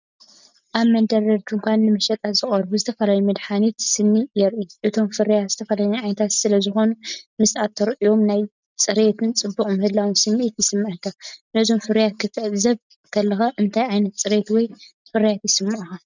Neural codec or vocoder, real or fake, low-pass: none; real; 7.2 kHz